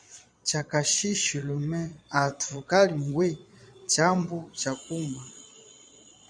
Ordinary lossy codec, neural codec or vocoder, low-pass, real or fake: MP3, 96 kbps; vocoder, 22.05 kHz, 80 mel bands, Vocos; 9.9 kHz; fake